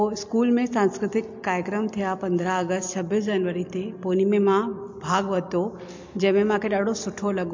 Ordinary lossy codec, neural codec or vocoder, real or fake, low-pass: MP3, 48 kbps; none; real; 7.2 kHz